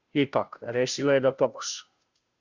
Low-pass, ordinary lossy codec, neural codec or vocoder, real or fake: 7.2 kHz; Opus, 64 kbps; codec, 16 kHz, 0.5 kbps, FunCodec, trained on Chinese and English, 25 frames a second; fake